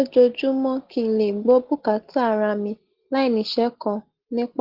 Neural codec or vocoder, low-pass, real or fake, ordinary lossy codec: none; 5.4 kHz; real; Opus, 16 kbps